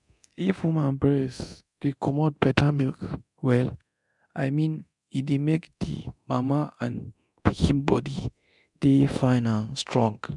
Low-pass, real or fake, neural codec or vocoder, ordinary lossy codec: 10.8 kHz; fake; codec, 24 kHz, 0.9 kbps, DualCodec; none